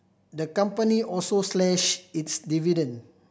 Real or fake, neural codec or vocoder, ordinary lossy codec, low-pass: real; none; none; none